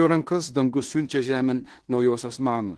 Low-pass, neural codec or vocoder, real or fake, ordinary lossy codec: 10.8 kHz; codec, 16 kHz in and 24 kHz out, 0.9 kbps, LongCat-Audio-Codec, fine tuned four codebook decoder; fake; Opus, 16 kbps